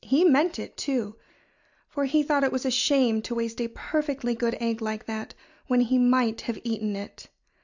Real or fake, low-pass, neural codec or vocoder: real; 7.2 kHz; none